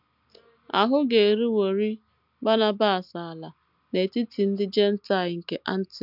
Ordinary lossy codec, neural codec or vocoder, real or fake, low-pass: none; none; real; 5.4 kHz